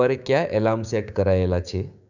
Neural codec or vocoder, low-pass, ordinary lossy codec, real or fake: codec, 16 kHz, 6 kbps, DAC; 7.2 kHz; none; fake